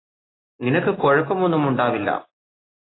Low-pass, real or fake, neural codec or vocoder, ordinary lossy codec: 7.2 kHz; real; none; AAC, 16 kbps